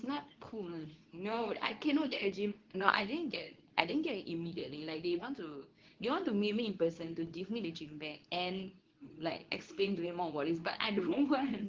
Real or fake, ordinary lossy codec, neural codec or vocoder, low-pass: fake; Opus, 16 kbps; codec, 24 kHz, 0.9 kbps, WavTokenizer, medium speech release version 2; 7.2 kHz